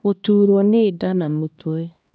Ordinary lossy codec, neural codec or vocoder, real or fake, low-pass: none; codec, 16 kHz, 1 kbps, X-Codec, HuBERT features, trained on LibriSpeech; fake; none